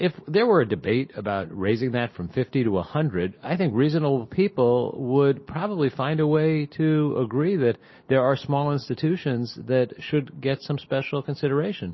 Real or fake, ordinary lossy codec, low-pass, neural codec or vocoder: real; MP3, 24 kbps; 7.2 kHz; none